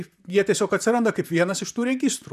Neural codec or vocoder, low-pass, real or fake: vocoder, 44.1 kHz, 128 mel bands, Pupu-Vocoder; 14.4 kHz; fake